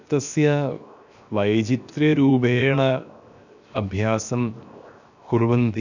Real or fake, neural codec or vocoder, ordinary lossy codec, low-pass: fake; codec, 16 kHz, 0.7 kbps, FocalCodec; none; 7.2 kHz